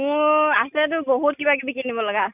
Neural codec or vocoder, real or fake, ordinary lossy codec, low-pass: none; real; none; 3.6 kHz